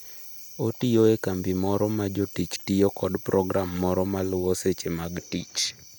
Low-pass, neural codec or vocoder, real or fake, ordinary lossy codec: none; none; real; none